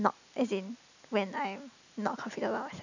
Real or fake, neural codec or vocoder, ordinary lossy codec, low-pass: real; none; none; 7.2 kHz